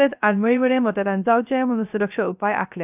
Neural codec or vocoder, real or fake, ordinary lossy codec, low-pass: codec, 16 kHz, 0.3 kbps, FocalCodec; fake; none; 3.6 kHz